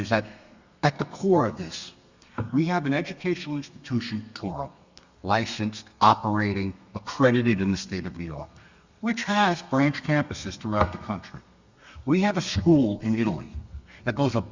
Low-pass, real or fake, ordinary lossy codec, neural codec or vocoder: 7.2 kHz; fake; Opus, 64 kbps; codec, 32 kHz, 1.9 kbps, SNAC